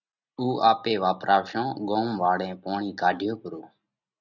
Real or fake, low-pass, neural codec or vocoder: real; 7.2 kHz; none